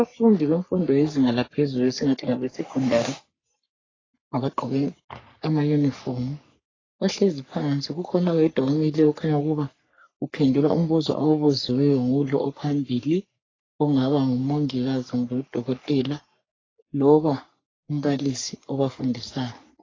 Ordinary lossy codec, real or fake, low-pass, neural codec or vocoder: AAC, 32 kbps; fake; 7.2 kHz; codec, 44.1 kHz, 3.4 kbps, Pupu-Codec